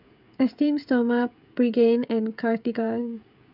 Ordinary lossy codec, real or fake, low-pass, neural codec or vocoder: none; fake; 5.4 kHz; codec, 16 kHz, 16 kbps, FreqCodec, smaller model